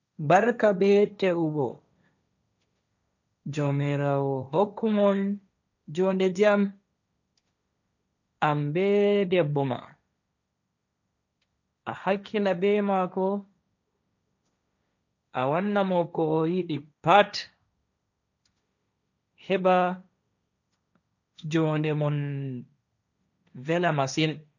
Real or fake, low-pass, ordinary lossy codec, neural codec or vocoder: fake; 7.2 kHz; none; codec, 16 kHz, 1.1 kbps, Voila-Tokenizer